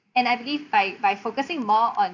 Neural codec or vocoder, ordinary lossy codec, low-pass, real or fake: none; none; 7.2 kHz; real